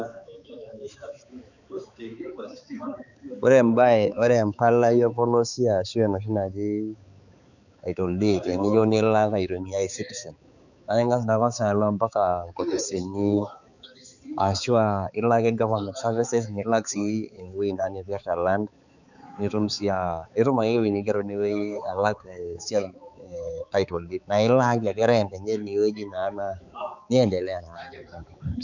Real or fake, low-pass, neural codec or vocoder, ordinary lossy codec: fake; 7.2 kHz; codec, 16 kHz, 4 kbps, X-Codec, HuBERT features, trained on balanced general audio; none